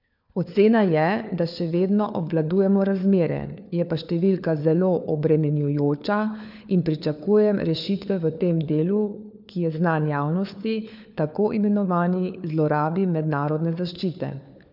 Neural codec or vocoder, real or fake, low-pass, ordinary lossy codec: codec, 16 kHz, 4 kbps, FunCodec, trained on LibriTTS, 50 frames a second; fake; 5.4 kHz; none